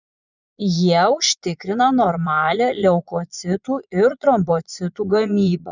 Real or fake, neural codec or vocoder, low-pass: fake; vocoder, 22.05 kHz, 80 mel bands, Vocos; 7.2 kHz